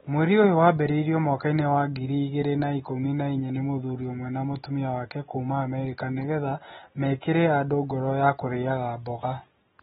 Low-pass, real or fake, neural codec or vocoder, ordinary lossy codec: 19.8 kHz; real; none; AAC, 16 kbps